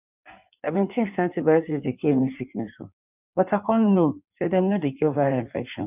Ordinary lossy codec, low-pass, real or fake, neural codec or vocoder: none; 3.6 kHz; fake; codec, 16 kHz in and 24 kHz out, 1.1 kbps, FireRedTTS-2 codec